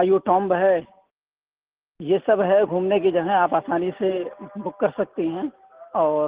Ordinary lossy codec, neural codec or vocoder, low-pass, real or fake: Opus, 16 kbps; none; 3.6 kHz; real